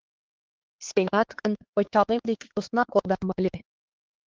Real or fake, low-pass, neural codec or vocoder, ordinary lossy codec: fake; 7.2 kHz; codec, 16 kHz, 4 kbps, X-Codec, HuBERT features, trained on LibriSpeech; Opus, 16 kbps